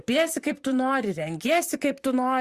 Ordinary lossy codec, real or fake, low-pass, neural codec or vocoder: Opus, 64 kbps; fake; 14.4 kHz; vocoder, 44.1 kHz, 128 mel bands, Pupu-Vocoder